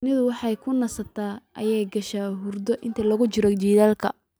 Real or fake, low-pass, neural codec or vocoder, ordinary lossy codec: real; none; none; none